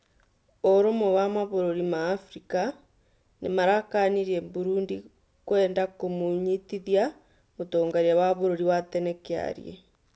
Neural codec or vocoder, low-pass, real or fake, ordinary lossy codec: none; none; real; none